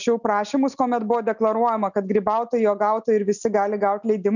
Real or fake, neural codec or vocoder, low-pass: real; none; 7.2 kHz